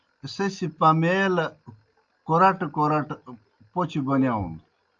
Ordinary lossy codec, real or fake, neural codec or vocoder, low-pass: Opus, 24 kbps; real; none; 7.2 kHz